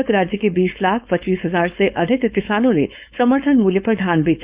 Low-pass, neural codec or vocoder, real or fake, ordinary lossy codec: 3.6 kHz; codec, 16 kHz, 4.8 kbps, FACodec; fake; Opus, 64 kbps